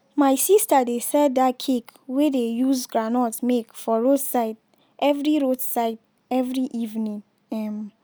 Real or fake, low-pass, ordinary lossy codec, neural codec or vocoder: real; none; none; none